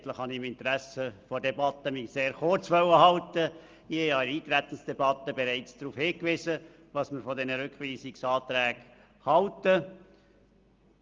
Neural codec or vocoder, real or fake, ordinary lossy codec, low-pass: none; real; Opus, 32 kbps; 7.2 kHz